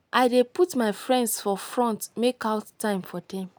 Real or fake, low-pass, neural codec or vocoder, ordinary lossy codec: real; none; none; none